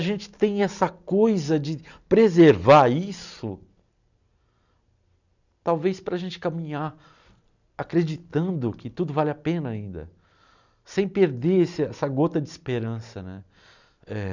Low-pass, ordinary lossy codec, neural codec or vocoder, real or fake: 7.2 kHz; none; none; real